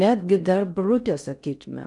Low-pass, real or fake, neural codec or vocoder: 10.8 kHz; fake; codec, 16 kHz in and 24 kHz out, 0.6 kbps, FocalCodec, streaming, 4096 codes